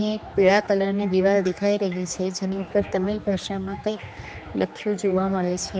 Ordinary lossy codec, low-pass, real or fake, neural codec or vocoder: none; none; fake; codec, 16 kHz, 2 kbps, X-Codec, HuBERT features, trained on general audio